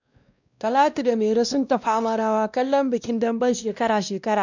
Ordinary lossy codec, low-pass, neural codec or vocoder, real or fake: none; 7.2 kHz; codec, 16 kHz, 1 kbps, X-Codec, WavLM features, trained on Multilingual LibriSpeech; fake